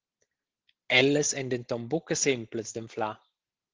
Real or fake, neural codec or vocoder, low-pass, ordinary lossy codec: real; none; 7.2 kHz; Opus, 16 kbps